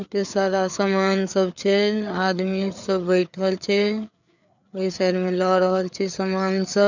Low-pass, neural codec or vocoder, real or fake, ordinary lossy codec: 7.2 kHz; codec, 16 kHz, 4 kbps, FreqCodec, larger model; fake; none